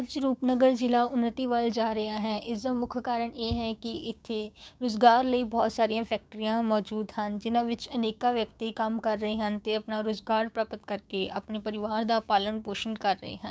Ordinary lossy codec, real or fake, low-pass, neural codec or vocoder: none; fake; none; codec, 16 kHz, 6 kbps, DAC